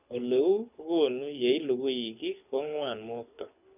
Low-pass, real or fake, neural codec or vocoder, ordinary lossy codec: 3.6 kHz; fake; codec, 24 kHz, 6 kbps, HILCodec; none